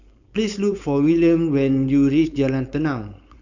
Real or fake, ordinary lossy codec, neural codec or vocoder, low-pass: fake; none; codec, 16 kHz, 4.8 kbps, FACodec; 7.2 kHz